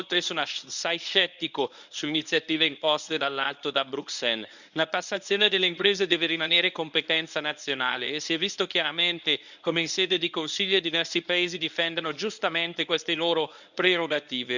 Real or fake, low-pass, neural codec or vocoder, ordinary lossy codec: fake; 7.2 kHz; codec, 24 kHz, 0.9 kbps, WavTokenizer, medium speech release version 1; none